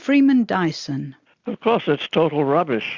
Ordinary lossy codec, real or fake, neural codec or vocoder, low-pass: Opus, 64 kbps; real; none; 7.2 kHz